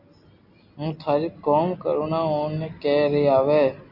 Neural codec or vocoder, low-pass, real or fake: none; 5.4 kHz; real